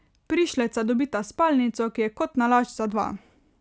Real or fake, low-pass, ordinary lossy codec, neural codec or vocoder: real; none; none; none